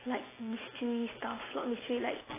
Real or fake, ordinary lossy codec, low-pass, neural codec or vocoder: real; AAC, 16 kbps; 3.6 kHz; none